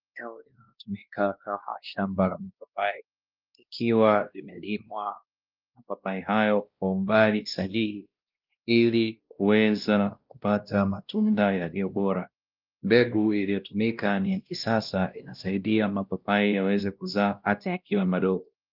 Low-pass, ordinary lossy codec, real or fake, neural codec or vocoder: 5.4 kHz; Opus, 32 kbps; fake; codec, 16 kHz, 1 kbps, X-Codec, WavLM features, trained on Multilingual LibriSpeech